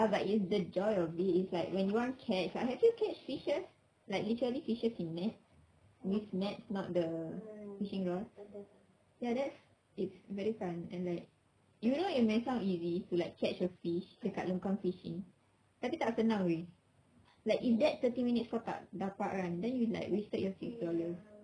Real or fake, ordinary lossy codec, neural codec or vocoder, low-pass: real; none; none; 9.9 kHz